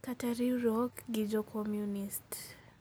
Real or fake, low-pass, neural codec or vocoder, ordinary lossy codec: real; none; none; none